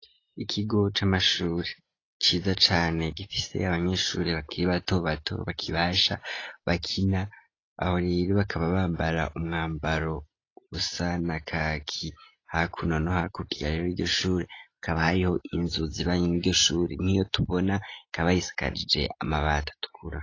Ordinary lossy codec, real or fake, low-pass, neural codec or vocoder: AAC, 32 kbps; real; 7.2 kHz; none